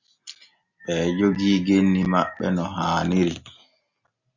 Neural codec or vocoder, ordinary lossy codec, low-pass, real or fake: none; Opus, 64 kbps; 7.2 kHz; real